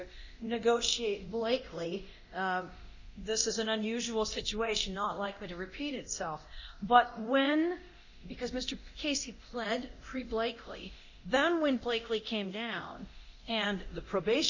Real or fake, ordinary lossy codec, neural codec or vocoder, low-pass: fake; Opus, 64 kbps; codec, 24 kHz, 0.9 kbps, DualCodec; 7.2 kHz